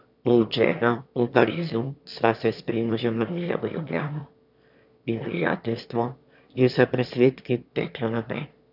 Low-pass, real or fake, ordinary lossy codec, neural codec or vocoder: 5.4 kHz; fake; none; autoencoder, 22.05 kHz, a latent of 192 numbers a frame, VITS, trained on one speaker